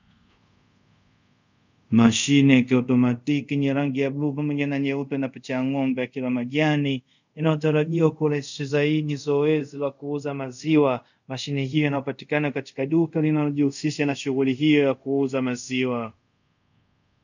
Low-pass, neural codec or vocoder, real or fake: 7.2 kHz; codec, 24 kHz, 0.5 kbps, DualCodec; fake